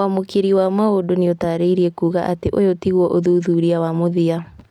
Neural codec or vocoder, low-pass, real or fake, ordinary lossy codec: none; 19.8 kHz; real; none